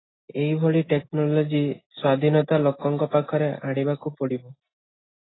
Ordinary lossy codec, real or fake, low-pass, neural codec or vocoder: AAC, 16 kbps; real; 7.2 kHz; none